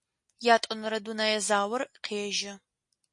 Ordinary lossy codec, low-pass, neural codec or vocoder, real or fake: MP3, 48 kbps; 10.8 kHz; none; real